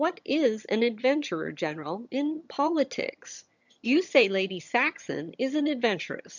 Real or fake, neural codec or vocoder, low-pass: fake; vocoder, 22.05 kHz, 80 mel bands, HiFi-GAN; 7.2 kHz